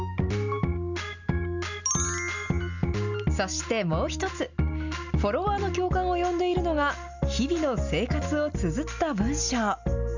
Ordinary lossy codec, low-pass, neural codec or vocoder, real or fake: none; 7.2 kHz; none; real